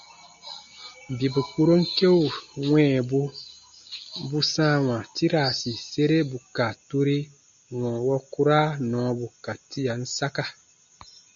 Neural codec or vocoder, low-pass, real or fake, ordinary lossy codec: none; 7.2 kHz; real; MP3, 96 kbps